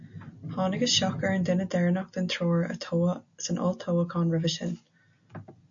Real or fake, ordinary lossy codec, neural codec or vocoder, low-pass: real; MP3, 48 kbps; none; 7.2 kHz